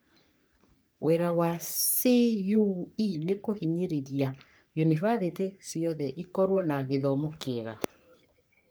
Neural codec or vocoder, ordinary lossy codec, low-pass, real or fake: codec, 44.1 kHz, 3.4 kbps, Pupu-Codec; none; none; fake